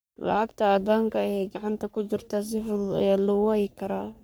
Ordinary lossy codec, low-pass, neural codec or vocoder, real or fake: none; none; codec, 44.1 kHz, 3.4 kbps, Pupu-Codec; fake